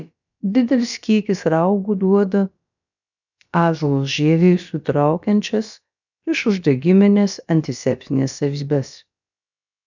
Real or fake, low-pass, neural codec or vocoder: fake; 7.2 kHz; codec, 16 kHz, about 1 kbps, DyCAST, with the encoder's durations